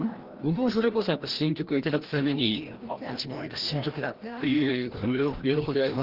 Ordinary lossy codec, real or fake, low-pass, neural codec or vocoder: Opus, 16 kbps; fake; 5.4 kHz; codec, 16 kHz, 1 kbps, FreqCodec, larger model